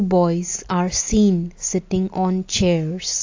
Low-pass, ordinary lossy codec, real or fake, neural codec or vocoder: 7.2 kHz; AAC, 48 kbps; real; none